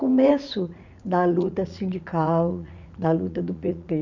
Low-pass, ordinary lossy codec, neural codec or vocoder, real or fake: 7.2 kHz; none; vocoder, 44.1 kHz, 80 mel bands, Vocos; fake